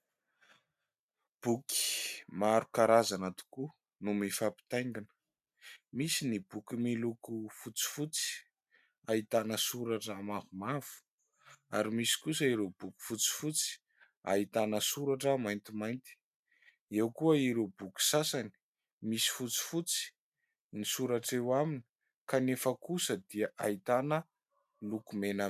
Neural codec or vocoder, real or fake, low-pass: none; real; 14.4 kHz